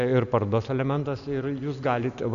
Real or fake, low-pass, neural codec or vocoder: real; 7.2 kHz; none